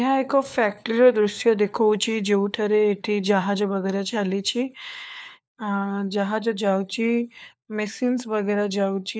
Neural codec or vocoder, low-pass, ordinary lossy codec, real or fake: codec, 16 kHz, 4 kbps, FunCodec, trained on LibriTTS, 50 frames a second; none; none; fake